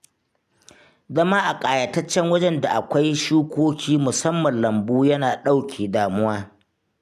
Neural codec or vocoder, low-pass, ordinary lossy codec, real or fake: none; 14.4 kHz; none; real